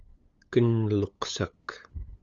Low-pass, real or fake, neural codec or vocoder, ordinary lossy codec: 7.2 kHz; fake; codec, 16 kHz, 8 kbps, FunCodec, trained on LibriTTS, 25 frames a second; Opus, 32 kbps